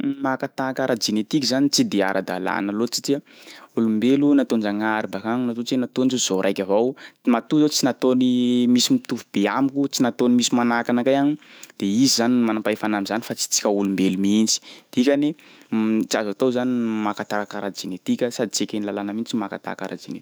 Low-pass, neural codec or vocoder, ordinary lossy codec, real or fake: none; autoencoder, 48 kHz, 128 numbers a frame, DAC-VAE, trained on Japanese speech; none; fake